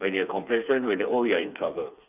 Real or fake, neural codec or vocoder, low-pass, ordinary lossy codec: fake; codec, 16 kHz, 4 kbps, FreqCodec, smaller model; 3.6 kHz; none